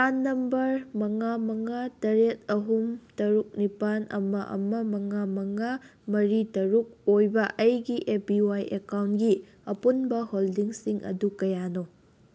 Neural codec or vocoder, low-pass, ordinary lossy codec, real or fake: none; none; none; real